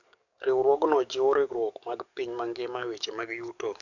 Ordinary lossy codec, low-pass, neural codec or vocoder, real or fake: none; 7.2 kHz; codec, 44.1 kHz, 7.8 kbps, DAC; fake